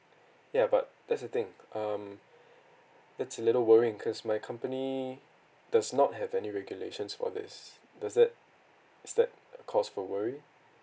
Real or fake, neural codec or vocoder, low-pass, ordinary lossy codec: real; none; none; none